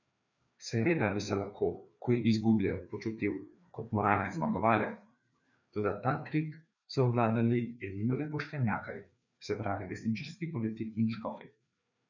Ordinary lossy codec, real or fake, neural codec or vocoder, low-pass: none; fake; codec, 16 kHz, 2 kbps, FreqCodec, larger model; 7.2 kHz